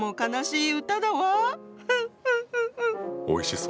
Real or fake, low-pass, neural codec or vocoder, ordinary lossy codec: real; none; none; none